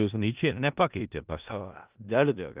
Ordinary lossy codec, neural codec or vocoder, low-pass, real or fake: Opus, 32 kbps; codec, 16 kHz in and 24 kHz out, 0.4 kbps, LongCat-Audio-Codec, four codebook decoder; 3.6 kHz; fake